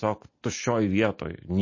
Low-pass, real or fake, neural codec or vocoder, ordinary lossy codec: 7.2 kHz; real; none; MP3, 32 kbps